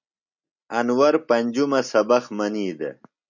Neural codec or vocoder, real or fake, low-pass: none; real; 7.2 kHz